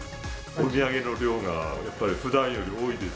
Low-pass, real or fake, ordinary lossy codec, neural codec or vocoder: none; real; none; none